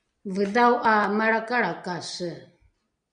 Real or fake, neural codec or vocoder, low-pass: real; none; 9.9 kHz